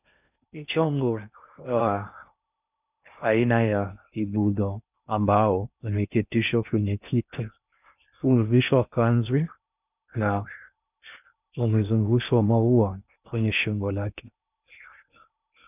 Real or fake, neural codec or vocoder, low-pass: fake; codec, 16 kHz in and 24 kHz out, 0.6 kbps, FocalCodec, streaming, 4096 codes; 3.6 kHz